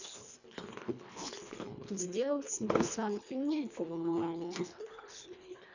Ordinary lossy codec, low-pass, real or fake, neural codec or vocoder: none; 7.2 kHz; fake; codec, 24 kHz, 1.5 kbps, HILCodec